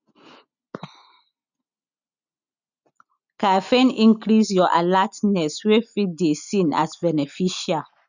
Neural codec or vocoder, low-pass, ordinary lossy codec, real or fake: none; 7.2 kHz; none; real